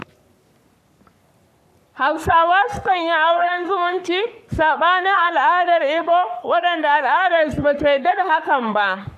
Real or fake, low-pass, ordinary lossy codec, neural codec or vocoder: fake; 14.4 kHz; none; codec, 44.1 kHz, 3.4 kbps, Pupu-Codec